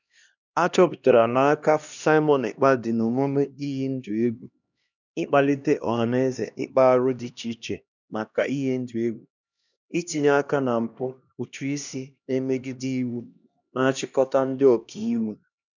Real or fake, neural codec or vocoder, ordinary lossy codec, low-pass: fake; codec, 16 kHz, 1 kbps, X-Codec, HuBERT features, trained on LibriSpeech; none; 7.2 kHz